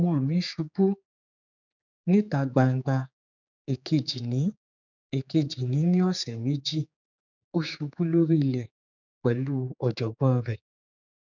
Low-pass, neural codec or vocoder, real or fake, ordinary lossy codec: 7.2 kHz; codec, 16 kHz, 4 kbps, X-Codec, HuBERT features, trained on general audio; fake; none